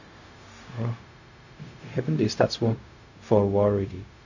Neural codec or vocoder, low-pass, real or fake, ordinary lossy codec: codec, 16 kHz, 0.4 kbps, LongCat-Audio-Codec; 7.2 kHz; fake; none